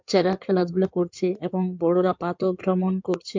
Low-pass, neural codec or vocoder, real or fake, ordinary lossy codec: 7.2 kHz; codec, 16 kHz in and 24 kHz out, 2.2 kbps, FireRedTTS-2 codec; fake; MP3, 64 kbps